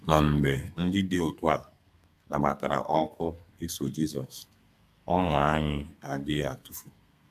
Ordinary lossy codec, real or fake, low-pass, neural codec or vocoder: AAC, 96 kbps; fake; 14.4 kHz; codec, 32 kHz, 1.9 kbps, SNAC